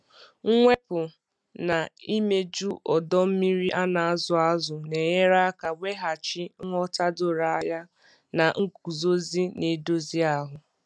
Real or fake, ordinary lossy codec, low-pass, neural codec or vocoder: real; none; none; none